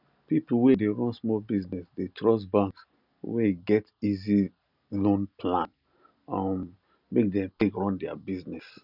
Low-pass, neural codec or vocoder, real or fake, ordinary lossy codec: 5.4 kHz; none; real; none